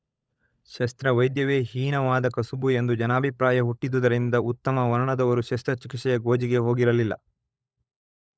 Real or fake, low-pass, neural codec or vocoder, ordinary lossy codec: fake; none; codec, 16 kHz, 16 kbps, FunCodec, trained on LibriTTS, 50 frames a second; none